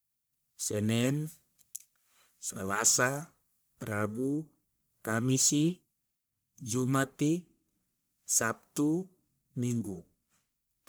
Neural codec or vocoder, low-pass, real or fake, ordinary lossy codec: codec, 44.1 kHz, 1.7 kbps, Pupu-Codec; none; fake; none